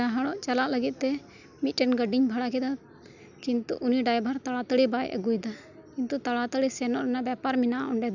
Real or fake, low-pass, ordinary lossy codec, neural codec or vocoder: real; 7.2 kHz; none; none